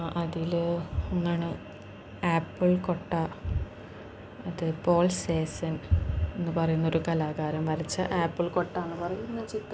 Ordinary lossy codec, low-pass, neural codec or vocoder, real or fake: none; none; none; real